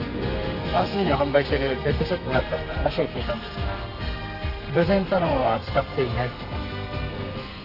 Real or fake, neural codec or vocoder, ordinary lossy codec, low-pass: fake; codec, 32 kHz, 1.9 kbps, SNAC; none; 5.4 kHz